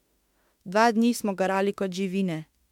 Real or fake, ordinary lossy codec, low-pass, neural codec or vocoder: fake; MP3, 96 kbps; 19.8 kHz; autoencoder, 48 kHz, 32 numbers a frame, DAC-VAE, trained on Japanese speech